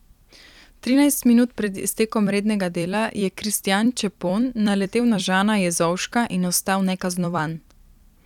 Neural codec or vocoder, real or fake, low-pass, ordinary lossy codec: vocoder, 44.1 kHz, 128 mel bands every 256 samples, BigVGAN v2; fake; 19.8 kHz; none